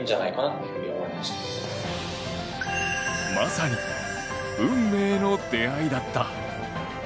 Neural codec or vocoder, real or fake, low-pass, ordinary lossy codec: none; real; none; none